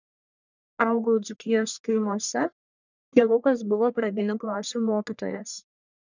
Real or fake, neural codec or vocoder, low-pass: fake; codec, 44.1 kHz, 1.7 kbps, Pupu-Codec; 7.2 kHz